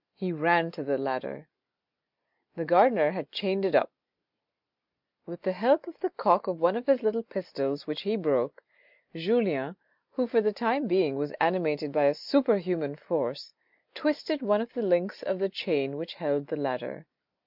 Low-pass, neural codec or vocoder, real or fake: 5.4 kHz; none; real